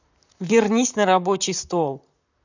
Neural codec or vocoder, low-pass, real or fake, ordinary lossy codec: vocoder, 44.1 kHz, 128 mel bands, Pupu-Vocoder; 7.2 kHz; fake; none